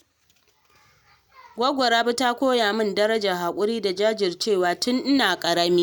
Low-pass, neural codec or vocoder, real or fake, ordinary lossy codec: none; none; real; none